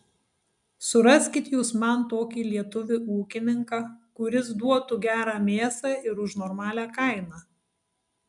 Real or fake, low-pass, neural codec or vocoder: real; 10.8 kHz; none